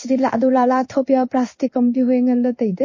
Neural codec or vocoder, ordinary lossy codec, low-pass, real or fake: codec, 16 kHz in and 24 kHz out, 1 kbps, XY-Tokenizer; MP3, 32 kbps; 7.2 kHz; fake